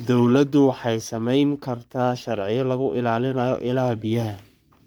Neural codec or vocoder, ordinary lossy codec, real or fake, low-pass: codec, 44.1 kHz, 3.4 kbps, Pupu-Codec; none; fake; none